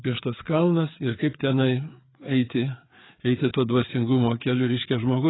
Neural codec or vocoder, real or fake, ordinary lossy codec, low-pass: codec, 16 kHz, 4 kbps, FreqCodec, larger model; fake; AAC, 16 kbps; 7.2 kHz